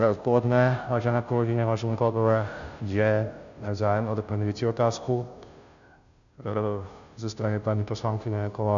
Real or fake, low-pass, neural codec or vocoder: fake; 7.2 kHz; codec, 16 kHz, 0.5 kbps, FunCodec, trained on Chinese and English, 25 frames a second